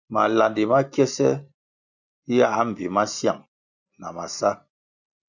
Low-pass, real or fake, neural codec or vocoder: 7.2 kHz; real; none